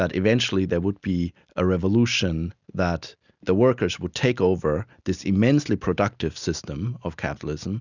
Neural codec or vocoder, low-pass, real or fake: none; 7.2 kHz; real